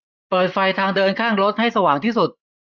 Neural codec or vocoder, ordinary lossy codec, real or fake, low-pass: vocoder, 24 kHz, 100 mel bands, Vocos; none; fake; 7.2 kHz